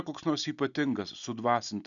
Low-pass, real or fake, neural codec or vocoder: 7.2 kHz; real; none